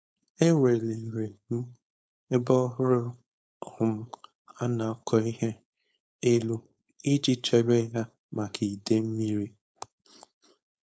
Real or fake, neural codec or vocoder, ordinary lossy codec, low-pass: fake; codec, 16 kHz, 4.8 kbps, FACodec; none; none